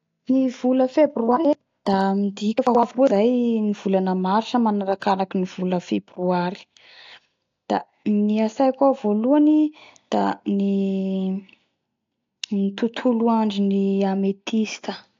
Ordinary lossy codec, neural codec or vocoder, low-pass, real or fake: AAC, 48 kbps; none; 7.2 kHz; real